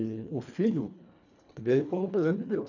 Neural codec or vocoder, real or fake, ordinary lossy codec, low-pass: codec, 24 kHz, 1.5 kbps, HILCodec; fake; none; 7.2 kHz